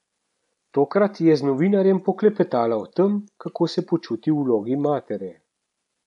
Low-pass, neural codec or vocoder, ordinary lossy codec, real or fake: 10.8 kHz; none; none; real